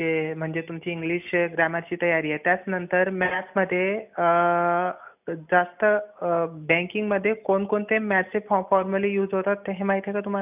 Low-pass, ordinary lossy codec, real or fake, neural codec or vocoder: 3.6 kHz; none; real; none